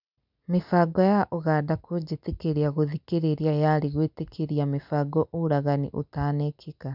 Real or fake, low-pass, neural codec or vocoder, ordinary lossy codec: real; 5.4 kHz; none; none